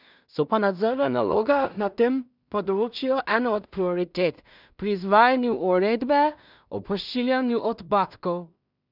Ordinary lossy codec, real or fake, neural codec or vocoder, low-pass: none; fake; codec, 16 kHz in and 24 kHz out, 0.4 kbps, LongCat-Audio-Codec, two codebook decoder; 5.4 kHz